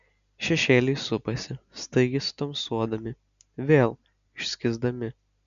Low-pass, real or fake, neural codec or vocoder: 7.2 kHz; real; none